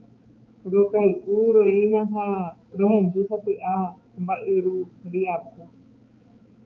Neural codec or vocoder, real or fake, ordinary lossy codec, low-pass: codec, 16 kHz, 4 kbps, X-Codec, HuBERT features, trained on balanced general audio; fake; Opus, 24 kbps; 7.2 kHz